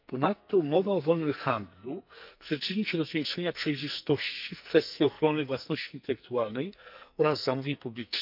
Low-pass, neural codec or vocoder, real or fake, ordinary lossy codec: 5.4 kHz; codec, 44.1 kHz, 2.6 kbps, SNAC; fake; none